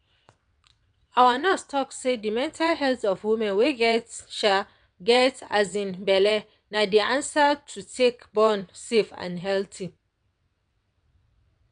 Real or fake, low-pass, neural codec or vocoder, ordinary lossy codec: fake; 9.9 kHz; vocoder, 22.05 kHz, 80 mel bands, WaveNeXt; none